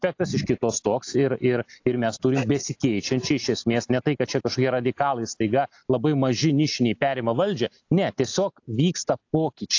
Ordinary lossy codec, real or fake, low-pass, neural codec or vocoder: AAC, 48 kbps; real; 7.2 kHz; none